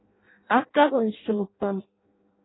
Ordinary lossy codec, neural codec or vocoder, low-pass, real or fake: AAC, 16 kbps; codec, 16 kHz in and 24 kHz out, 0.6 kbps, FireRedTTS-2 codec; 7.2 kHz; fake